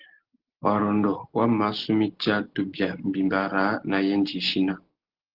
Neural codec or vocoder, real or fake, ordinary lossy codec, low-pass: none; real; Opus, 16 kbps; 5.4 kHz